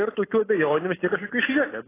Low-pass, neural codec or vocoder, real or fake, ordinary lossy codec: 3.6 kHz; none; real; AAC, 16 kbps